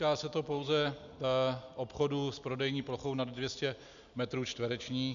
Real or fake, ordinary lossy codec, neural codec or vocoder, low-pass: real; MP3, 96 kbps; none; 7.2 kHz